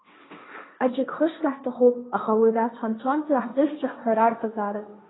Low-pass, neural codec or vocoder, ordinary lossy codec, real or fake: 7.2 kHz; codec, 16 kHz, 1.1 kbps, Voila-Tokenizer; AAC, 16 kbps; fake